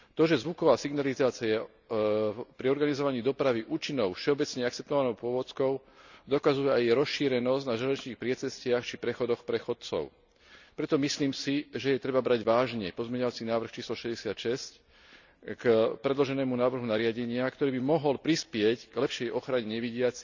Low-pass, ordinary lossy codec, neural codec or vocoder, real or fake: 7.2 kHz; none; none; real